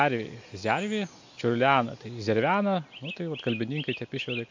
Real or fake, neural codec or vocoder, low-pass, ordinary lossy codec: real; none; 7.2 kHz; MP3, 48 kbps